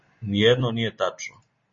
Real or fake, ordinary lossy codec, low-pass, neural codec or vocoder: real; MP3, 32 kbps; 7.2 kHz; none